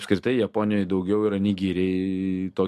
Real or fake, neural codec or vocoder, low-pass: real; none; 14.4 kHz